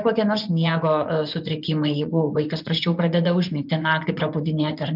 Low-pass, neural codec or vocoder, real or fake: 5.4 kHz; vocoder, 24 kHz, 100 mel bands, Vocos; fake